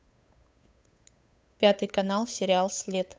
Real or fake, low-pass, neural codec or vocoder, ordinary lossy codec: fake; none; codec, 16 kHz, 8 kbps, FunCodec, trained on Chinese and English, 25 frames a second; none